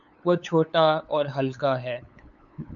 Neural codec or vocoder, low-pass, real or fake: codec, 16 kHz, 8 kbps, FunCodec, trained on LibriTTS, 25 frames a second; 7.2 kHz; fake